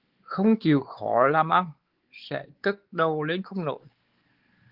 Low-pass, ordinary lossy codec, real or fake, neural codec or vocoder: 5.4 kHz; Opus, 16 kbps; fake; codec, 16 kHz, 4 kbps, X-Codec, WavLM features, trained on Multilingual LibriSpeech